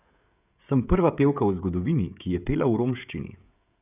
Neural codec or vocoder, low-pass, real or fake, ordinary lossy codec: codec, 16 kHz, 16 kbps, FreqCodec, smaller model; 3.6 kHz; fake; none